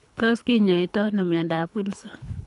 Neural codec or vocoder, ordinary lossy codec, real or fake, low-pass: codec, 24 kHz, 3 kbps, HILCodec; none; fake; 10.8 kHz